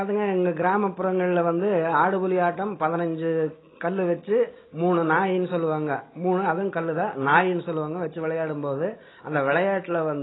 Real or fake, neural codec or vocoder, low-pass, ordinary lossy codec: real; none; 7.2 kHz; AAC, 16 kbps